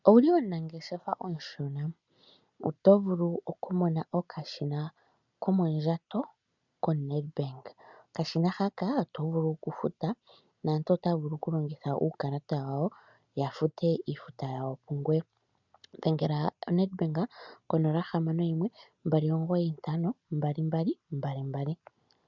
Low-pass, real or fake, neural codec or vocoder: 7.2 kHz; real; none